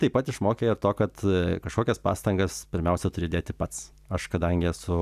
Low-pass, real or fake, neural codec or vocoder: 14.4 kHz; real; none